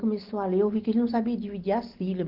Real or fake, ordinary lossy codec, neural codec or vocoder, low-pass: real; Opus, 16 kbps; none; 5.4 kHz